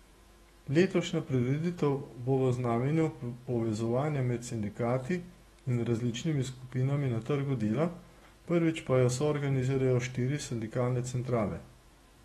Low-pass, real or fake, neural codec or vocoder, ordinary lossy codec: 19.8 kHz; fake; autoencoder, 48 kHz, 128 numbers a frame, DAC-VAE, trained on Japanese speech; AAC, 32 kbps